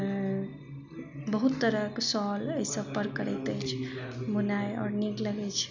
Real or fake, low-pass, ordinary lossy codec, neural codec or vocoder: real; 7.2 kHz; none; none